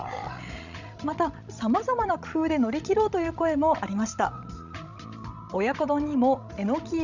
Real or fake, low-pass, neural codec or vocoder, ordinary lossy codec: fake; 7.2 kHz; codec, 16 kHz, 16 kbps, FreqCodec, larger model; none